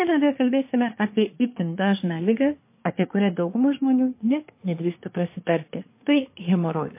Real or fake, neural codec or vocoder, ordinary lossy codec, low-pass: fake; codec, 24 kHz, 1 kbps, SNAC; MP3, 24 kbps; 3.6 kHz